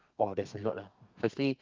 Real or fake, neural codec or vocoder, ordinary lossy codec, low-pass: fake; codec, 32 kHz, 1.9 kbps, SNAC; Opus, 24 kbps; 7.2 kHz